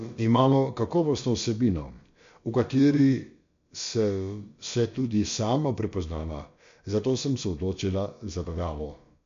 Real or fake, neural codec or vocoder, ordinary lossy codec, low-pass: fake; codec, 16 kHz, about 1 kbps, DyCAST, with the encoder's durations; MP3, 48 kbps; 7.2 kHz